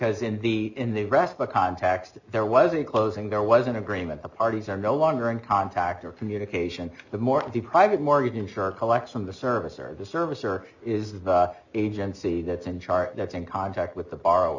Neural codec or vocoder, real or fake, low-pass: none; real; 7.2 kHz